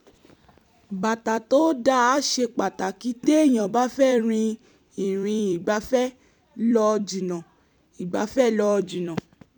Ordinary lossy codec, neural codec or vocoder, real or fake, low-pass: none; vocoder, 44.1 kHz, 128 mel bands every 256 samples, BigVGAN v2; fake; 19.8 kHz